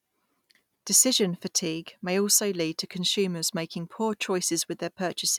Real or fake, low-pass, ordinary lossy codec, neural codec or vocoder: real; 19.8 kHz; none; none